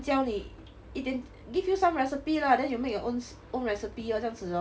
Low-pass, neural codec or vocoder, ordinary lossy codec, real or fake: none; none; none; real